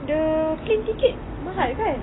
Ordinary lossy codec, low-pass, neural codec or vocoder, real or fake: AAC, 16 kbps; 7.2 kHz; none; real